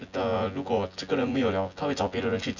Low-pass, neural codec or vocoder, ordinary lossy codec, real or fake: 7.2 kHz; vocoder, 24 kHz, 100 mel bands, Vocos; none; fake